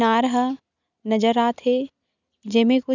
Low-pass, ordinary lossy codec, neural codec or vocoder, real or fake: 7.2 kHz; none; none; real